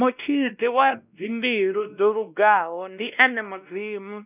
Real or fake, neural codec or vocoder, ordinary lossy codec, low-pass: fake; codec, 16 kHz, 1 kbps, X-Codec, WavLM features, trained on Multilingual LibriSpeech; none; 3.6 kHz